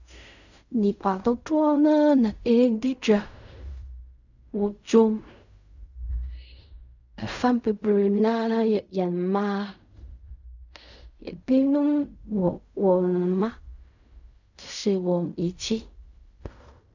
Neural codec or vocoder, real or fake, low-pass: codec, 16 kHz in and 24 kHz out, 0.4 kbps, LongCat-Audio-Codec, fine tuned four codebook decoder; fake; 7.2 kHz